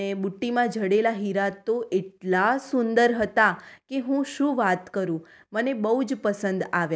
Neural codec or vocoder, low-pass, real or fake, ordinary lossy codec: none; none; real; none